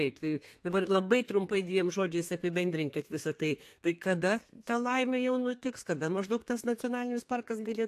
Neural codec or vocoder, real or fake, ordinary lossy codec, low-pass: codec, 32 kHz, 1.9 kbps, SNAC; fake; AAC, 64 kbps; 14.4 kHz